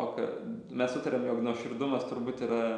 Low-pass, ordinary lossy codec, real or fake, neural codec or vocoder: 9.9 kHz; MP3, 96 kbps; real; none